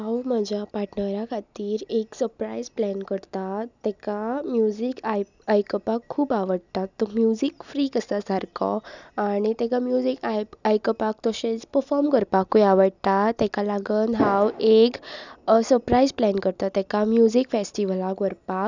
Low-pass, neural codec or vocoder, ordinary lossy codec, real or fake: 7.2 kHz; none; none; real